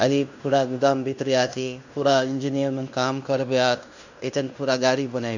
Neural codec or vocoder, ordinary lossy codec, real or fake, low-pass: codec, 16 kHz in and 24 kHz out, 0.9 kbps, LongCat-Audio-Codec, fine tuned four codebook decoder; none; fake; 7.2 kHz